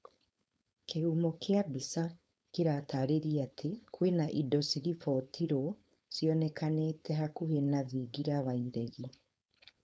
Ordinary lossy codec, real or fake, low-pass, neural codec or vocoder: none; fake; none; codec, 16 kHz, 4.8 kbps, FACodec